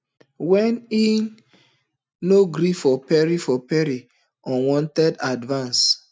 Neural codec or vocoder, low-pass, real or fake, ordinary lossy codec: none; none; real; none